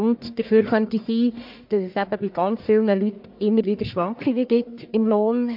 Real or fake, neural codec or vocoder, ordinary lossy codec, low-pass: fake; codec, 44.1 kHz, 1.7 kbps, Pupu-Codec; MP3, 48 kbps; 5.4 kHz